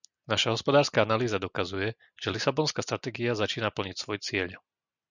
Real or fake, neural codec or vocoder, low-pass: real; none; 7.2 kHz